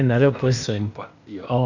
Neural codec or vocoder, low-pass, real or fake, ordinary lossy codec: codec, 16 kHz, 0.8 kbps, ZipCodec; 7.2 kHz; fake; none